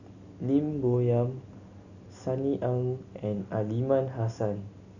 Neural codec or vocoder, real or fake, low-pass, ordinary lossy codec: none; real; 7.2 kHz; none